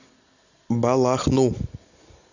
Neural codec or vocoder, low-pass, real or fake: none; 7.2 kHz; real